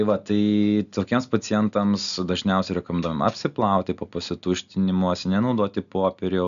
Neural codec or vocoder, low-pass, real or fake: none; 7.2 kHz; real